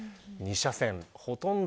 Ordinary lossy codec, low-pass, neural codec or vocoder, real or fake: none; none; none; real